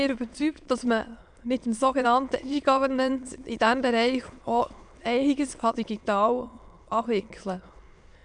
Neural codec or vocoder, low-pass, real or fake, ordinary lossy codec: autoencoder, 22.05 kHz, a latent of 192 numbers a frame, VITS, trained on many speakers; 9.9 kHz; fake; none